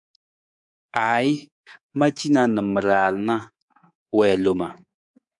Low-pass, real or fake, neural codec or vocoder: 10.8 kHz; fake; codec, 24 kHz, 3.1 kbps, DualCodec